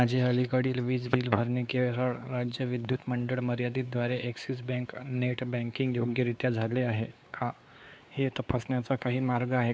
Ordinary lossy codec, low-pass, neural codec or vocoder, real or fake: none; none; codec, 16 kHz, 4 kbps, X-Codec, WavLM features, trained on Multilingual LibriSpeech; fake